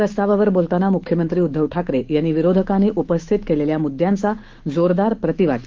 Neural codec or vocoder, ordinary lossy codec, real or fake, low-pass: autoencoder, 48 kHz, 128 numbers a frame, DAC-VAE, trained on Japanese speech; Opus, 16 kbps; fake; 7.2 kHz